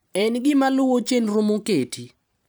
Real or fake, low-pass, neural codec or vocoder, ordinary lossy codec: fake; none; vocoder, 44.1 kHz, 128 mel bands every 512 samples, BigVGAN v2; none